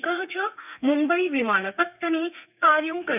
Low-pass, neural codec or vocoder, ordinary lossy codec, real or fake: 3.6 kHz; codec, 32 kHz, 1.9 kbps, SNAC; none; fake